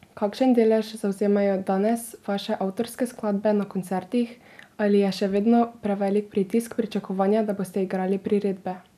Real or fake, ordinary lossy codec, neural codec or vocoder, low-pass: real; none; none; 14.4 kHz